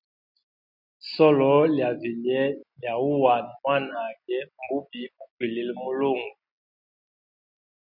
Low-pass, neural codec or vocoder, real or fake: 5.4 kHz; none; real